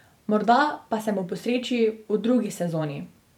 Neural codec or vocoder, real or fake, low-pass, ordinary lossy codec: vocoder, 44.1 kHz, 128 mel bands every 512 samples, BigVGAN v2; fake; 19.8 kHz; none